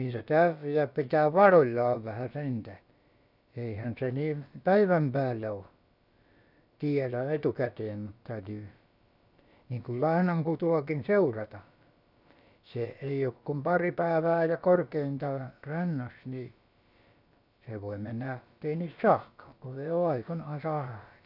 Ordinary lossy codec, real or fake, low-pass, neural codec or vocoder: none; fake; 5.4 kHz; codec, 16 kHz, about 1 kbps, DyCAST, with the encoder's durations